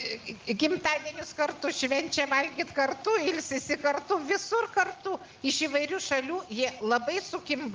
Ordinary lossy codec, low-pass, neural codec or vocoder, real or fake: Opus, 32 kbps; 7.2 kHz; none; real